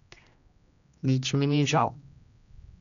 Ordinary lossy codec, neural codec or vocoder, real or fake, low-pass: none; codec, 16 kHz, 1 kbps, X-Codec, HuBERT features, trained on general audio; fake; 7.2 kHz